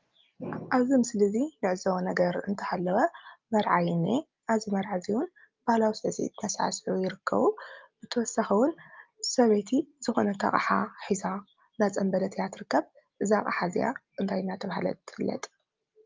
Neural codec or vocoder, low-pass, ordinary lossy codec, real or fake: none; 7.2 kHz; Opus, 24 kbps; real